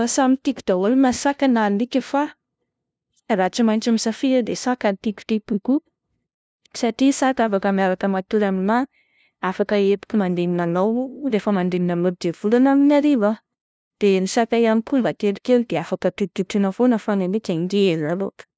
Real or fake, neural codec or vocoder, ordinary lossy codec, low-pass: fake; codec, 16 kHz, 0.5 kbps, FunCodec, trained on LibriTTS, 25 frames a second; none; none